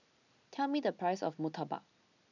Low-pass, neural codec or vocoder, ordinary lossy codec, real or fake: 7.2 kHz; none; none; real